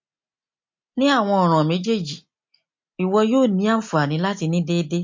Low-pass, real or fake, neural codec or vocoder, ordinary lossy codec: 7.2 kHz; real; none; MP3, 48 kbps